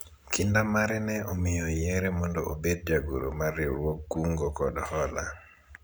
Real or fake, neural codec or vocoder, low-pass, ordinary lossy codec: real; none; none; none